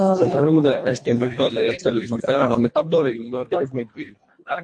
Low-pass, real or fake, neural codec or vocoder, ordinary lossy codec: 9.9 kHz; fake; codec, 24 kHz, 1.5 kbps, HILCodec; MP3, 48 kbps